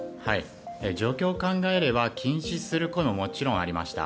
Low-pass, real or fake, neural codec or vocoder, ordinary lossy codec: none; real; none; none